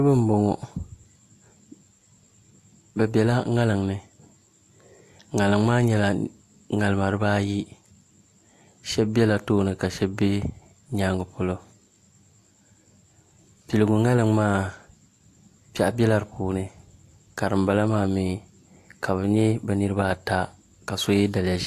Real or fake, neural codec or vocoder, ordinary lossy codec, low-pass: real; none; AAC, 64 kbps; 14.4 kHz